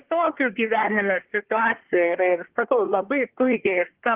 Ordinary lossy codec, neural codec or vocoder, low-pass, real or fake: Opus, 16 kbps; codec, 24 kHz, 1 kbps, SNAC; 3.6 kHz; fake